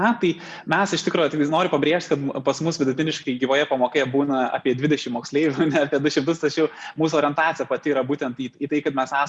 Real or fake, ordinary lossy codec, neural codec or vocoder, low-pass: real; Opus, 64 kbps; none; 10.8 kHz